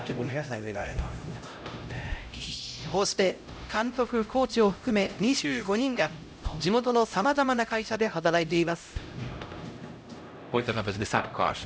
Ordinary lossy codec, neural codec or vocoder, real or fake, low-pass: none; codec, 16 kHz, 0.5 kbps, X-Codec, HuBERT features, trained on LibriSpeech; fake; none